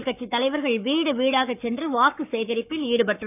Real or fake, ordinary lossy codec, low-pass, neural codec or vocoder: fake; none; 3.6 kHz; codec, 44.1 kHz, 7.8 kbps, Pupu-Codec